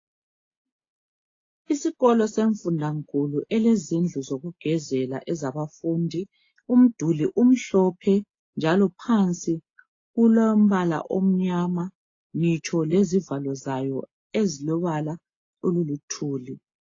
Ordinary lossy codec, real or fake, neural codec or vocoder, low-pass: AAC, 32 kbps; real; none; 7.2 kHz